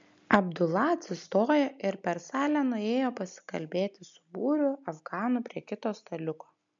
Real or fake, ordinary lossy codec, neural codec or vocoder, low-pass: real; MP3, 64 kbps; none; 7.2 kHz